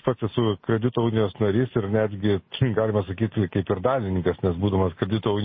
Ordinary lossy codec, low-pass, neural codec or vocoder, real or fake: MP3, 24 kbps; 7.2 kHz; none; real